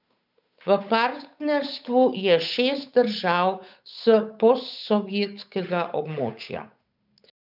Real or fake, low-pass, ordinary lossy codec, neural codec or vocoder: fake; 5.4 kHz; none; codec, 16 kHz, 8 kbps, FunCodec, trained on Chinese and English, 25 frames a second